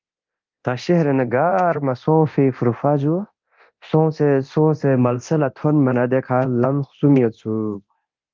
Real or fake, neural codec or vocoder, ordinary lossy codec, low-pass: fake; codec, 24 kHz, 0.9 kbps, DualCodec; Opus, 24 kbps; 7.2 kHz